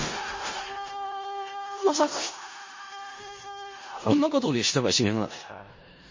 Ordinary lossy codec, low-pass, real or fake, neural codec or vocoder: MP3, 32 kbps; 7.2 kHz; fake; codec, 16 kHz in and 24 kHz out, 0.4 kbps, LongCat-Audio-Codec, four codebook decoder